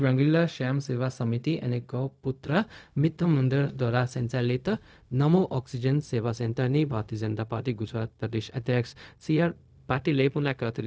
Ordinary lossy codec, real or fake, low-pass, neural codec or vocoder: none; fake; none; codec, 16 kHz, 0.4 kbps, LongCat-Audio-Codec